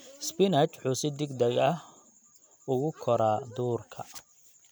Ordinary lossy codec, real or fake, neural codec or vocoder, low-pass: none; real; none; none